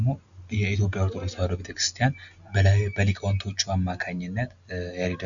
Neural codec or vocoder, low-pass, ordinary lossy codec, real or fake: none; 7.2 kHz; MP3, 96 kbps; real